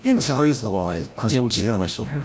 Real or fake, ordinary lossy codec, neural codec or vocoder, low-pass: fake; none; codec, 16 kHz, 0.5 kbps, FreqCodec, larger model; none